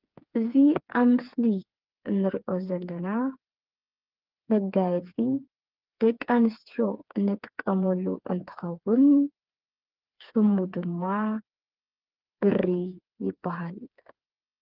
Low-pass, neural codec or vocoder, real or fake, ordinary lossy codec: 5.4 kHz; codec, 16 kHz, 4 kbps, FreqCodec, smaller model; fake; Opus, 32 kbps